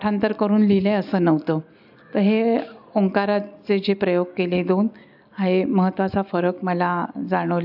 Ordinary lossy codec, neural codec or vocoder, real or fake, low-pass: AAC, 48 kbps; none; real; 5.4 kHz